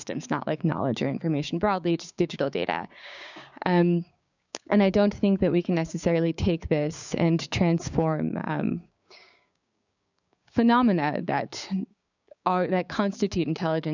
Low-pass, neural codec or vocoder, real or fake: 7.2 kHz; codec, 16 kHz, 6 kbps, DAC; fake